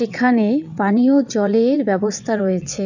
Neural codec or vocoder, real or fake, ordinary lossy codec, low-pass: vocoder, 22.05 kHz, 80 mel bands, WaveNeXt; fake; none; 7.2 kHz